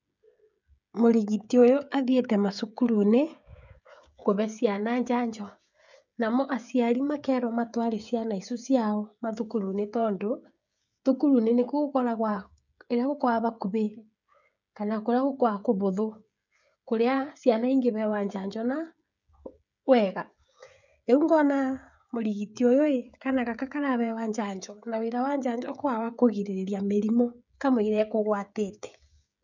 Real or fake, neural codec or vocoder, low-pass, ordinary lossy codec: fake; codec, 16 kHz, 16 kbps, FreqCodec, smaller model; 7.2 kHz; none